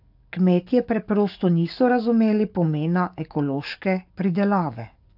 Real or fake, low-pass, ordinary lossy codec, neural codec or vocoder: fake; 5.4 kHz; AAC, 48 kbps; codec, 44.1 kHz, 7.8 kbps, DAC